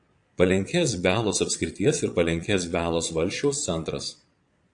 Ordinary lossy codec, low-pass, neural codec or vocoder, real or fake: AAC, 64 kbps; 9.9 kHz; vocoder, 22.05 kHz, 80 mel bands, Vocos; fake